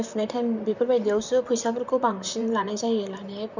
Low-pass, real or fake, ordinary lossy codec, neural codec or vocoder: 7.2 kHz; fake; none; vocoder, 44.1 kHz, 128 mel bands, Pupu-Vocoder